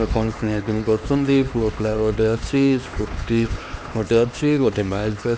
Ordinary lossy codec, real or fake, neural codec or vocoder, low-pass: none; fake; codec, 16 kHz, 2 kbps, X-Codec, HuBERT features, trained on LibriSpeech; none